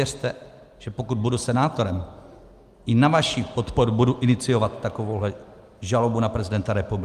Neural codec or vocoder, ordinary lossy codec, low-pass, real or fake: none; Opus, 32 kbps; 14.4 kHz; real